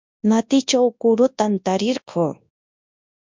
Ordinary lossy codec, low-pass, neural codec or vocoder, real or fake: MP3, 64 kbps; 7.2 kHz; codec, 24 kHz, 0.9 kbps, WavTokenizer, large speech release; fake